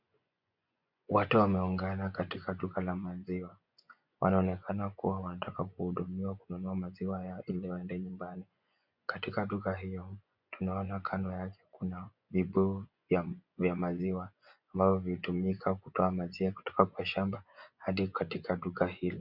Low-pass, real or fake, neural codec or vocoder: 5.4 kHz; fake; vocoder, 24 kHz, 100 mel bands, Vocos